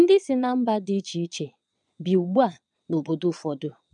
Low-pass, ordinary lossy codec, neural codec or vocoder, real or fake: 9.9 kHz; none; vocoder, 22.05 kHz, 80 mel bands, Vocos; fake